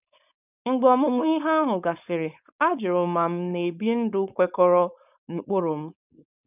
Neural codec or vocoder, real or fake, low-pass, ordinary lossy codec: codec, 16 kHz, 4.8 kbps, FACodec; fake; 3.6 kHz; none